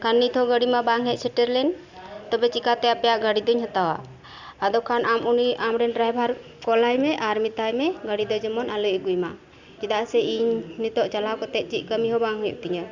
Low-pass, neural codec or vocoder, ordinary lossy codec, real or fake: 7.2 kHz; none; Opus, 64 kbps; real